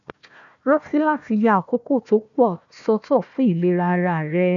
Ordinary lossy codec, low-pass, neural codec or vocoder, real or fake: none; 7.2 kHz; codec, 16 kHz, 1 kbps, FunCodec, trained on Chinese and English, 50 frames a second; fake